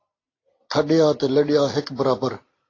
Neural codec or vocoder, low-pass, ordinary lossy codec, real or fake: none; 7.2 kHz; AAC, 32 kbps; real